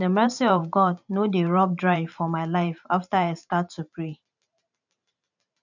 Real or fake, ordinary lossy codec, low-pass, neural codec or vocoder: fake; none; 7.2 kHz; vocoder, 44.1 kHz, 128 mel bands every 512 samples, BigVGAN v2